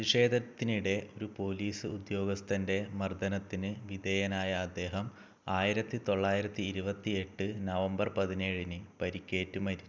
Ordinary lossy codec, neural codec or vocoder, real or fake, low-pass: none; none; real; none